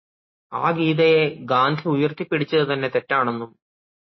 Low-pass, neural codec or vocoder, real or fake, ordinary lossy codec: 7.2 kHz; none; real; MP3, 24 kbps